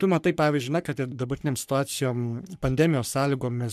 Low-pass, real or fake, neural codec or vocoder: 14.4 kHz; fake; codec, 44.1 kHz, 3.4 kbps, Pupu-Codec